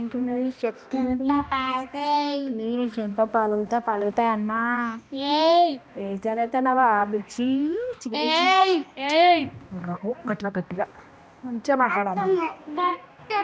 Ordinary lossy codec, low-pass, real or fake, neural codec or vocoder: none; none; fake; codec, 16 kHz, 1 kbps, X-Codec, HuBERT features, trained on balanced general audio